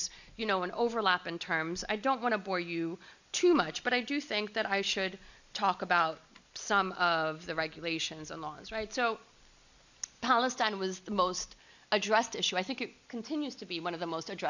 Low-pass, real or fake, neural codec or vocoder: 7.2 kHz; real; none